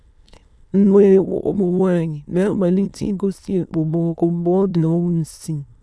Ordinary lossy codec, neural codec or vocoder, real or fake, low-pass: none; autoencoder, 22.05 kHz, a latent of 192 numbers a frame, VITS, trained on many speakers; fake; none